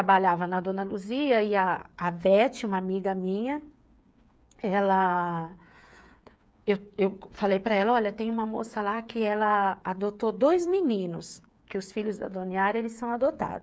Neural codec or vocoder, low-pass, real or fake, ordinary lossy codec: codec, 16 kHz, 8 kbps, FreqCodec, smaller model; none; fake; none